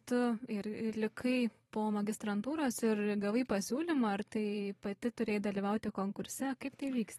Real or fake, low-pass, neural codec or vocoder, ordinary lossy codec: fake; 19.8 kHz; vocoder, 44.1 kHz, 128 mel bands every 512 samples, BigVGAN v2; AAC, 32 kbps